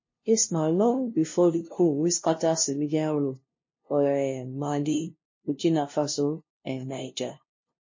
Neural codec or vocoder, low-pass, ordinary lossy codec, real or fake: codec, 16 kHz, 0.5 kbps, FunCodec, trained on LibriTTS, 25 frames a second; 7.2 kHz; MP3, 32 kbps; fake